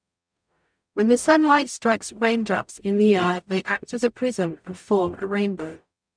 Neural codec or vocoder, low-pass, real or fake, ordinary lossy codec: codec, 44.1 kHz, 0.9 kbps, DAC; 9.9 kHz; fake; MP3, 96 kbps